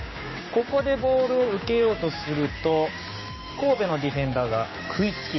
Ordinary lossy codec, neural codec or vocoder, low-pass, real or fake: MP3, 24 kbps; codec, 16 kHz, 6 kbps, DAC; 7.2 kHz; fake